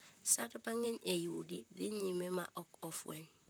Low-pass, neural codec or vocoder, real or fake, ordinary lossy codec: none; vocoder, 44.1 kHz, 128 mel bands, Pupu-Vocoder; fake; none